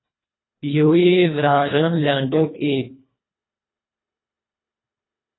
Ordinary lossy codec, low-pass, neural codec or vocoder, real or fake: AAC, 16 kbps; 7.2 kHz; codec, 24 kHz, 1.5 kbps, HILCodec; fake